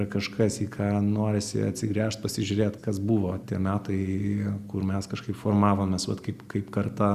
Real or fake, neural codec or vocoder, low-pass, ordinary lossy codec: real; none; 14.4 kHz; Opus, 64 kbps